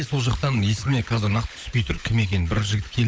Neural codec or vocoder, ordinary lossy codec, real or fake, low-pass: codec, 16 kHz, 16 kbps, FunCodec, trained on LibriTTS, 50 frames a second; none; fake; none